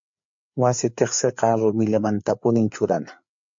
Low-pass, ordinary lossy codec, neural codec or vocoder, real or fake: 7.2 kHz; MP3, 48 kbps; codec, 16 kHz, 4 kbps, FreqCodec, larger model; fake